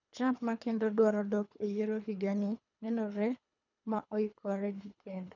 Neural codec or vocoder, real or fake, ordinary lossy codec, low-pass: codec, 24 kHz, 3 kbps, HILCodec; fake; none; 7.2 kHz